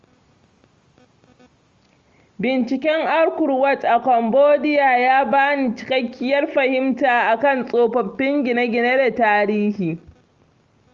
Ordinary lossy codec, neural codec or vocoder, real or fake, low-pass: Opus, 32 kbps; none; real; 7.2 kHz